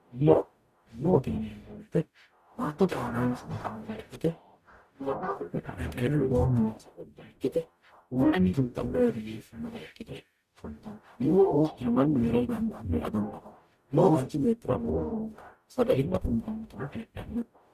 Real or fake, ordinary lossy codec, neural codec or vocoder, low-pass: fake; Opus, 64 kbps; codec, 44.1 kHz, 0.9 kbps, DAC; 14.4 kHz